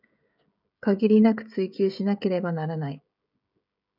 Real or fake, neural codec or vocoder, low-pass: fake; codec, 16 kHz, 16 kbps, FreqCodec, smaller model; 5.4 kHz